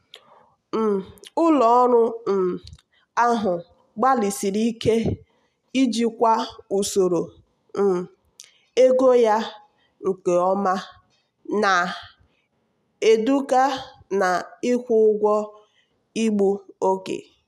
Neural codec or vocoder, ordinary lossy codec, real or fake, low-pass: none; none; real; 14.4 kHz